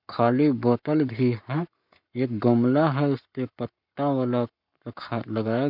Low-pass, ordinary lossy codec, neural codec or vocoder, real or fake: 5.4 kHz; none; codec, 44.1 kHz, 7.8 kbps, Pupu-Codec; fake